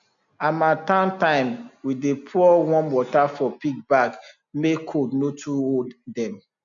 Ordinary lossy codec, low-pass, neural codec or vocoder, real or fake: MP3, 96 kbps; 7.2 kHz; none; real